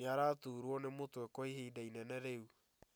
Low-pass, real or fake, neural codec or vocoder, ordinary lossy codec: none; real; none; none